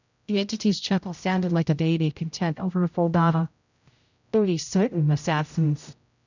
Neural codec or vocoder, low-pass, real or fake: codec, 16 kHz, 0.5 kbps, X-Codec, HuBERT features, trained on general audio; 7.2 kHz; fake